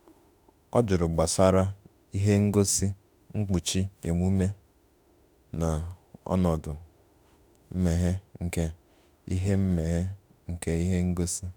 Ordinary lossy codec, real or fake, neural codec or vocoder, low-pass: none; fake; autoencoder, 48 kHz, 32 numbers a frame, DAC-VAE, trained on Japanese speech; none